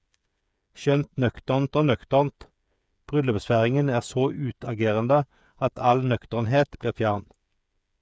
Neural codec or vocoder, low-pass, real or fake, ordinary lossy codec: codec, 16 kHz, 8 kbps, FreqCodec, smaller model; none; fake; none